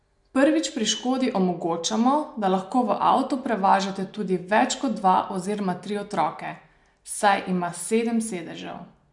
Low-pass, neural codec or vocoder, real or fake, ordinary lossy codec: 10.8 kHz; none; real; MP3, 64 kbps